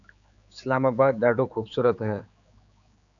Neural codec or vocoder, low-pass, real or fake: codec, 16 kHz, 4 kbps, X-Codec, HuBERT features, trained on general audio; 7.2 kHz; fake